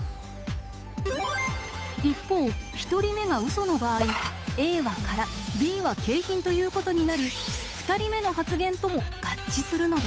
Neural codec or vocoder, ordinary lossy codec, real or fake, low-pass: codec, 16 kHz, 8 kbps, FunCodec, trained on Chinese and English, 25 frames a second; none; fake; none